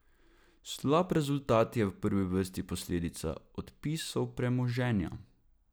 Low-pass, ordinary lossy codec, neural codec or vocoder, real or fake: none; none; none; real